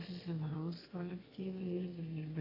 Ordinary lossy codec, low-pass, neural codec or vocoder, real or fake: AAC, 48 kbps; 5.4 kHz; autoencoder, 22.05 kHz, a latent of 192 numbers a frame, VITS, trained on one speaker; fake